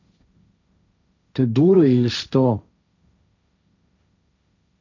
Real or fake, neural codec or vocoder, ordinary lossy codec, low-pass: fake; codec, 16 kHz, 1.1 kbps, Voila-Tokenizer; none; 7.2 kHz